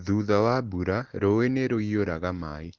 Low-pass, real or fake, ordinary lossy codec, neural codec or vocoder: 7.2 kHz; real; Opus, 16 kbps; none